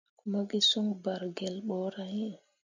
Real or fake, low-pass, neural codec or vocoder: real; 7.2 kHz; none